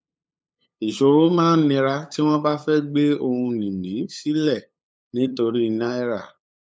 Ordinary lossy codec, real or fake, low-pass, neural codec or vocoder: none; fake; none; codec, 16 kHz, 8 kbps, FunCodec, trained on LibriTTS, 25 frames a second